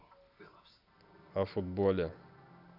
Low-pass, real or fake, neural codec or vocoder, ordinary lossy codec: 5.4 kHz; real; none; none